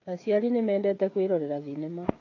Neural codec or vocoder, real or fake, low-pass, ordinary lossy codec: codec, 16 kHz, 16 kbps, FreqCodec, smaller model; fake; 7.2 kHz; AAC, 32 kbps